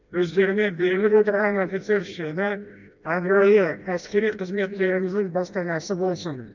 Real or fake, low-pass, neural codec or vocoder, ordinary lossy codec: fake; 7.2 kHz; codec, 16 kHz, 1 kbps, FreqCodec, smaller model; none